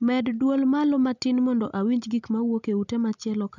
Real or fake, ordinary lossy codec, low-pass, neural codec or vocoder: real; none; 7.2 kHz; none